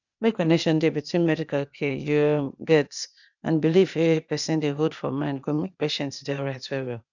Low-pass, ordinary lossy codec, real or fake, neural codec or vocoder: 7.2 kHz; none; fake; codec, 16 kHz, 0.8 kbps, ZipCodec